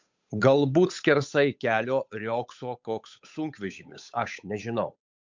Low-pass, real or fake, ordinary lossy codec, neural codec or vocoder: 7.2 kHz; fake; MP3, 64 kbps; codec, 16 kHz, 8 kbps, FunCodec, trained on Chinese and English, 25 frames a second